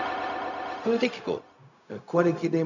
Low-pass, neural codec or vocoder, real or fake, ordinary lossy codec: 7.2 kHz; codec, 16 kHz, 0.4 kbps, LongCat-Audio-Codec; fake; none